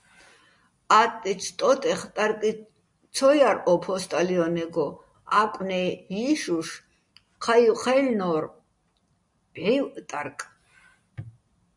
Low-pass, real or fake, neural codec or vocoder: 10.8 kHz; real; none